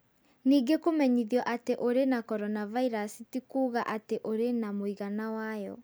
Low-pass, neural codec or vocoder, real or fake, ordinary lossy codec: none; none; real; none